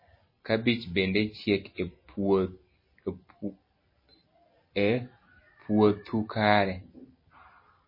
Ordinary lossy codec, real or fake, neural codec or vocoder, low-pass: MP3, 24 kbps; real; none; 5.4 kHz